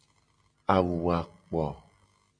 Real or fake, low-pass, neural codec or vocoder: real; 9.9 kHz; none